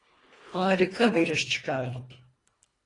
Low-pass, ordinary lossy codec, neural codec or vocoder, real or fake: 10.8 kHz; AAC, 32 kbps; codec, 24 kHz, 1.5 kbps, HILCodec; fake